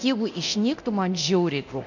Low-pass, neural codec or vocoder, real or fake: 7.2 kHz; codec, 24 kHz, 0.9 kbps, DualCodec; fake